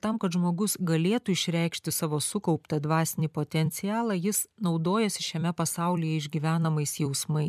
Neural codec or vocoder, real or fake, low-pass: vocoder, 44.1 kHz, 128 mel bands every 256 samples, BigVGAN v2; fake; 14.4 kHz